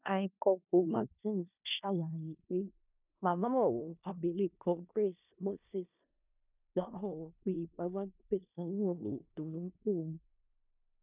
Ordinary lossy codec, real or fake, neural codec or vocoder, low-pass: none; fake; codec, 16 kHz in and 24 kHz out, 0.4 kbps, LongCat-Audio-Codec, four codebook decoder; 3.6 kHz